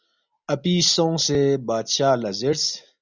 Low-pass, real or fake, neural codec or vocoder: 7.2 kHz; real; none